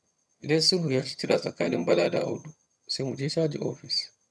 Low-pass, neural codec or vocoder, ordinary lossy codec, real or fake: none; vocoder, 22.05 kHz, 80 mel bands, HiFi-GAN; none; fake